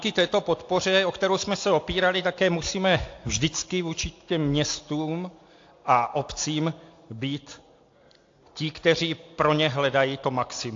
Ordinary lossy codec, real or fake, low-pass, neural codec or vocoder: AAC, 48 kbps; real; 7.2 kHz; none